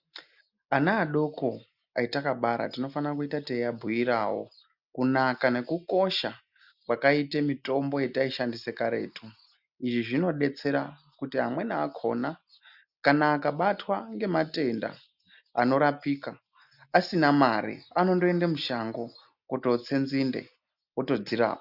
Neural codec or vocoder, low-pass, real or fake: none; 5.4 kHz; real